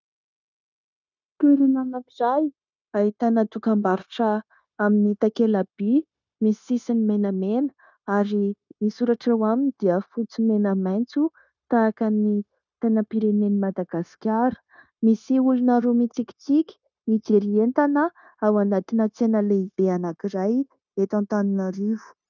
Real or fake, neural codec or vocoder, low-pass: fake; codec, 16 kHz, 0.9 kbps, LongCat-Audio-Codec; 7.2 kHz